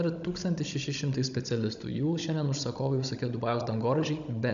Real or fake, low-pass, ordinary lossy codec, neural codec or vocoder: fake; 7.2 kHz; MP3, 96 kbps; codec, 16 kHz, 16 kbps, FunCodec, trained on Chinese and English, 50 frames a second